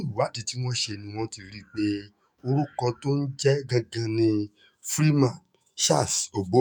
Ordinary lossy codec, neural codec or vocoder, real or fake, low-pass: none; autoencoder, 48 kHz, 128 numbers a frame, DAC-VAE, trained on Japanese speech; fake; none